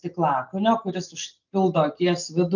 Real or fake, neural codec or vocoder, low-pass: real; none; 7.2 kHz